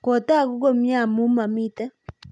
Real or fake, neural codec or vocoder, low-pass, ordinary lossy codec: real; none; none; none